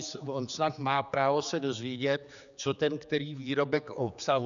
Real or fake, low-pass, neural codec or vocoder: fake; 7.2 kHz; codec, 16 kHz, 4 kbps, X-Codec, HuBERT features, trained on general audio